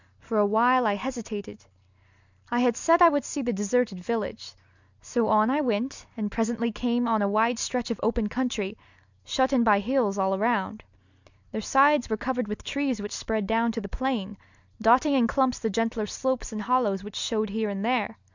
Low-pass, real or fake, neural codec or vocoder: 7.2 kHz; real; none